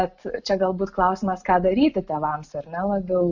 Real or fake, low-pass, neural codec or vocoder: real; 7.2 kHz; none